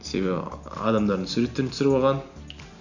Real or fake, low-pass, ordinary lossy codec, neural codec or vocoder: real; 7.2 kHz; none; none